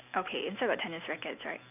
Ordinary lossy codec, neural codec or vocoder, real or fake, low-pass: none; none; real; 3.6 kHz